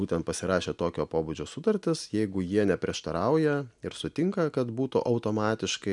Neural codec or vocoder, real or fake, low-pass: none; real; 10.8 kHz